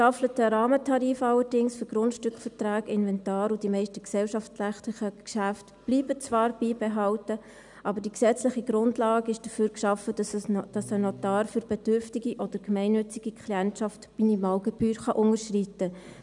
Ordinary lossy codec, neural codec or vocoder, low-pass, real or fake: none; none; 10.8 kHz; real